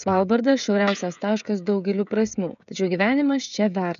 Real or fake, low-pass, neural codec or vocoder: fake; 7.2 kHz; codec, 16 kHz, 16 kbps, FreqCodec, smaller model